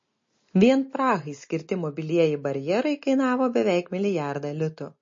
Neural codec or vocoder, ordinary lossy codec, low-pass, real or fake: none; MP3, 32 kbps; 7.2 kHz; real